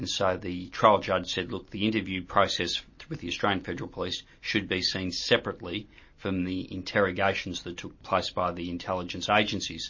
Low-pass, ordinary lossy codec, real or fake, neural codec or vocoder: 7.2 kHz; MP3, 32 kbps; real; none